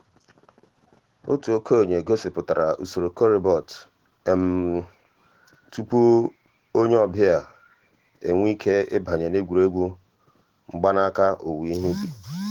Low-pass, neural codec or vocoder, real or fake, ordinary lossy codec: 19.8 kHz; autoencoder, 48 kHz, 128 numbers a frame, DAC-VAE, trained on Japanese speech; fake; Opus, 16 kbps